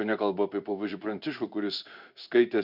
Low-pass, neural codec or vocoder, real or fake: 5.4 kHz; codec, 16 kHz in and 24 kHz out, 1 kbps, XY-Tokenizer; fake